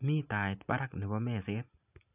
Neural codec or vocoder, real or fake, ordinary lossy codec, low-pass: none; real; none; 3.6 kHz